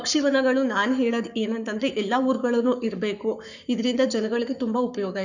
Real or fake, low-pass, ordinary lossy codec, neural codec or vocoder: fake; 7.2 kHz; none; codec, 44.1 kHz, 7.8 kbps, Pupu-Codec